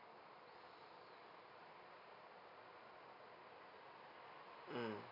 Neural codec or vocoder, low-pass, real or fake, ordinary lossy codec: none; 5.4 kHz; real; none